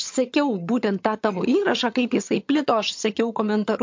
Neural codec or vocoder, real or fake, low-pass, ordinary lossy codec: vocoder, 22.05 kHz, 80 mel bands, HiFi-GAN; fake; 7.2 kHz; MP3, 48 kbps